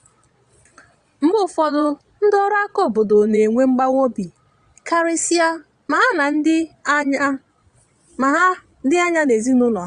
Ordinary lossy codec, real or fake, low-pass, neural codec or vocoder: Opus, 64 kbps; fake; 9.9 kHz; vocoder, 22.05 kHz, 80 mel bands, Vocos